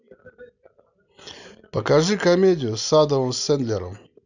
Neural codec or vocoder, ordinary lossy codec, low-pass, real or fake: none; none; 7.2 kHz; real